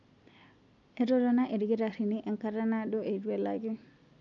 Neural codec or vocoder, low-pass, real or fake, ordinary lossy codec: none; 7.2 kHz; real; none